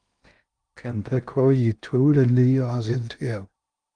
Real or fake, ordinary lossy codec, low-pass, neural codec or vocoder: fake; Opus, 32 kbps; 9.9 kHz; codec, 16 kHz in and 24 kHz out, 0.6 kbps, FocalCodec, streaming, 2048 codes